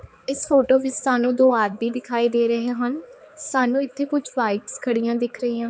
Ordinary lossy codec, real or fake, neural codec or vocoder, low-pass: none; fake; codec, 16 kHz, 4 kbps, X-Codec, HuBERT features, trained on balanced general audio; none